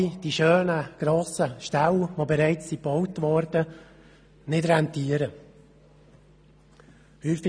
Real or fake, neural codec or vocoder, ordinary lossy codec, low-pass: real; none; none; 9.9 kHz